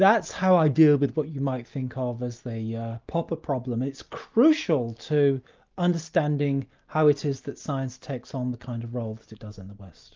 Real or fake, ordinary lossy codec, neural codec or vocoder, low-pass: real; Opus, 24 kbps; none; 7.2 kHz